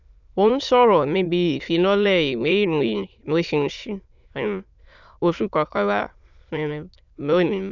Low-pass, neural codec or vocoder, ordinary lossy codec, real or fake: 7.2 kHz; autoencoder, 22.05 kHz, a latent of 192 numbers a frame, VITS, trained on many speakers; none; fake